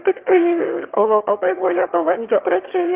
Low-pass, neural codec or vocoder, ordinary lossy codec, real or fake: 3.6 kHz; autoencoder, 22.05 kHz, a latent of 192 numbers a frame, VITS, trained on one speaker; Opus, 32 kbps; fake